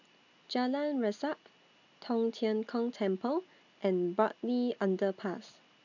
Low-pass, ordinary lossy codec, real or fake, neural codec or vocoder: 7.2 kHz; none; real; none